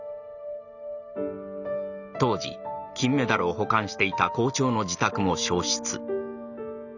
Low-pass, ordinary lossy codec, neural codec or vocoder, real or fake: 7.2 kHz; none; none; real